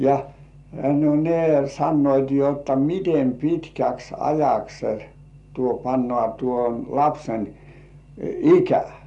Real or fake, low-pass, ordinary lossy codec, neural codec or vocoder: real; 10.8 kHz; none; none